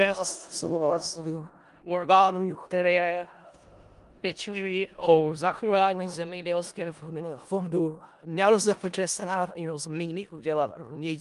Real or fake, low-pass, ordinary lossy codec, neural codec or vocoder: fake; 10.8 kHz; Opus, 32 kbps; codec, 16 kHz in and 24 kHz out, 0.4 kbps, LongCat-Audio-Codec, four codebook decoder